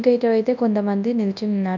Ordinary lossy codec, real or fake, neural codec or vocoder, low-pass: none; fake; codec, 24 kHz, 0.9 kbps, WavTokenizer, large speech release; 7.2 kHz